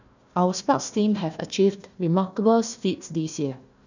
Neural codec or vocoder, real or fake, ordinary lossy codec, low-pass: codec, 16 kHz, 1 kbps, FunCodec, trained on Chinese and English, 50 frames a second; fake; none; 7.2 kHz